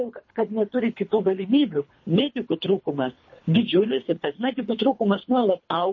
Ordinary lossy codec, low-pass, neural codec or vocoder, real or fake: MP3, 32 kbps; 7.2 kHz; codec, 24 kHz, 3 kbps, HILCodec; fake